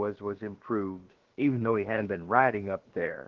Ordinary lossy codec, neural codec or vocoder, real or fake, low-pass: Opus, 16 kbps; codec, 16 kHz, about 1 kbps, DyCAST, with the encoder's durations; fake; 7.2 kHz